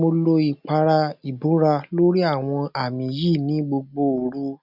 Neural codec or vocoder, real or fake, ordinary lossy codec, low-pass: none; real; none; 5.4 kHz